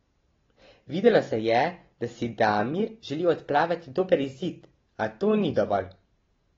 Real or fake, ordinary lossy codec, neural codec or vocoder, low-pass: real; AAC, 24 kbps; none; 7.2 kHz